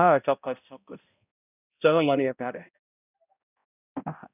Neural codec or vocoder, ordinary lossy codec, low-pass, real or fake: codec, 16 kHz, 0.5 kbps, X-Codec, HuBERT features, trained on balanced general audio; none; 3.6 kHz; fake